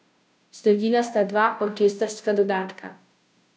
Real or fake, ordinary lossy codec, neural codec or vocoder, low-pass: fake; none; codec, 16 kHz, 0.5 kbps, FunCodec, trained on Chinese and English, 25 frames a second; none